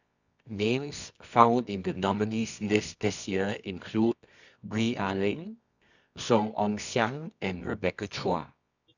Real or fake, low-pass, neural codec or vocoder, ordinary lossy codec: fake; 7.2 kHz; codec, 24 kHz, 0.9 kbps, WavTokenizer, medium music audio release; none